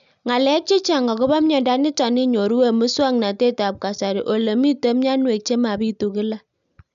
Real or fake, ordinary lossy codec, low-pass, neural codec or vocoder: real; none; 7.2 kHz; none